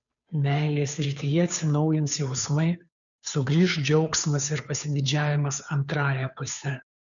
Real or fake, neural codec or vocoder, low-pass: fake; codec, 16 kHz, 2 kbps, FunCodec, trained on Chinese and English, 25 frames a second; 7.2 kHz